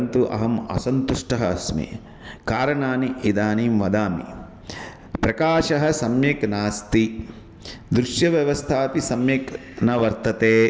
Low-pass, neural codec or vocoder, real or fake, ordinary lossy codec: none; none; real; none